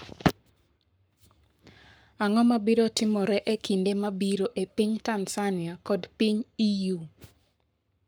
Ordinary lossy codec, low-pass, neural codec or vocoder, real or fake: none; none; codec, 44.1 kHz, 7.8 kbps, Pupu-Codec; fake